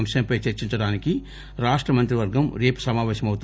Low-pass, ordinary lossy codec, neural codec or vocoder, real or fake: none; none; none; real